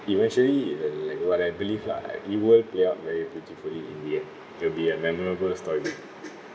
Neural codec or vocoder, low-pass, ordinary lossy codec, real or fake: none; none; none; real